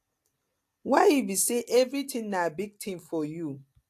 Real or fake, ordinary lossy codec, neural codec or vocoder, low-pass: real; AAC, 64 kbps; none; 14.4 kHz